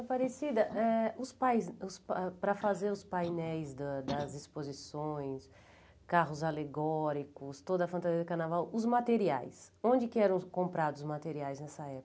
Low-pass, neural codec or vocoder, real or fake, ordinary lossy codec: none; none; real; none